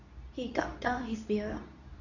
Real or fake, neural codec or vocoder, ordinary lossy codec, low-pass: fake; codec, 24 kHz, 0.9 kbps, WavTokenizer, medium speech release version 2; none; 7.2 kHz